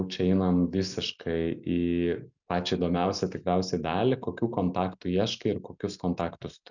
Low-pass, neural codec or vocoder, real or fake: 7.2 kHz; none; real